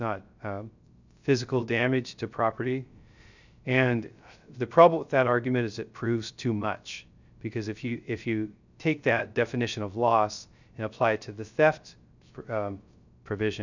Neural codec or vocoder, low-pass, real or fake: codec, 16 kHz, 0.3 kbps, FocalCodec; 7.2 kHz; fake